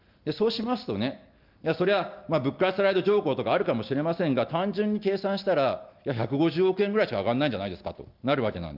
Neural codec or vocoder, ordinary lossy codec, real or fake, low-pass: none; Opus, 24 kbps; real; 5.4 kHz